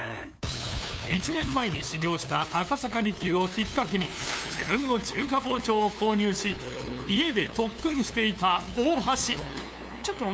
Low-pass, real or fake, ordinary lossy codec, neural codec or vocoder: none; fake; none; codec, 16 kHz, 2 kbps, FunCodec, trained on LibriTTS, 25 frames a second